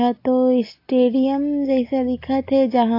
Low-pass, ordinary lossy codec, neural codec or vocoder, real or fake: 5.4 kHz; AAC, 32 kbps; none; real